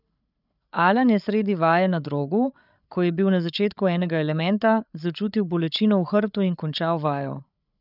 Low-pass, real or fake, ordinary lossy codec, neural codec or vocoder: 5.4 kHz; fake; none; codec, 16 kHz, 8 kbps, FreqCodec, larger model